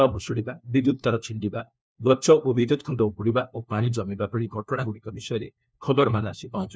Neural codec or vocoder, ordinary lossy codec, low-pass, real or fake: codec, 16 kHz, 1 kbps, FunCodec, trained on LibriTTS, 50 frames a second; none; none; fake